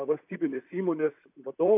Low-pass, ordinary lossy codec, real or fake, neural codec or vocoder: 3.6 kHz; AAC, 32 kbps; fake; vocoder, 44.1 kHz, 128 mel bands, Pupu-Vocoder